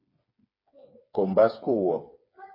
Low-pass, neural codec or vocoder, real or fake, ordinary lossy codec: 5.4 kHz; codec, 16 kHz, 4 kbps, FreqCodec, smaller model; fake; MP3, 24 kbps